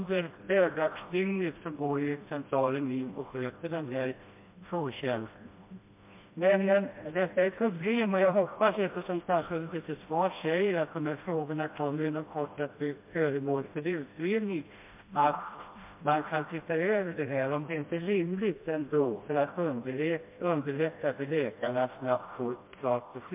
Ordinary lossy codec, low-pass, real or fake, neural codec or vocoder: MP3, 32 kbps; 3.6 kHz; fake; codec, 16 kHz, 1 kbps, FreqCodec, smaller model